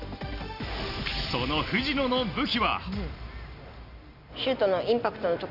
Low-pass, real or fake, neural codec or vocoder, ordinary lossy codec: 5.4 kHz; real; none; none